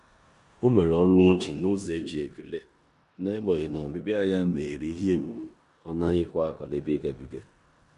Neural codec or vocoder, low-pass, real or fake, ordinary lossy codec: codec, 16 kHz in and 24 kHz out, 0.9 kbps, LongCat-Audio-Codec, four codebook decoder; 10.8 kHz; fake; MP3, 64 kbps